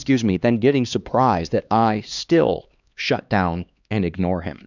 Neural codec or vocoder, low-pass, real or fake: codec, 16 kHz, 2 kbps, X-Codec, HuBERT features, trained on LibriSpeech; 7.2 kHz; fake